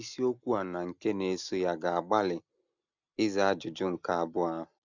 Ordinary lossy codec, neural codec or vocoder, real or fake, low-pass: none; none; real; 7.2 kHz